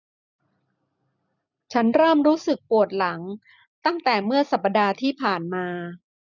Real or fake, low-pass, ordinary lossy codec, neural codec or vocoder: real; 7.2 kHz; none; none